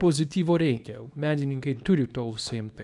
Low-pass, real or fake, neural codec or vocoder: 10.8 kHz; fake; codec, 24 kHz, 0.9 kbps, WavTokenizer, small release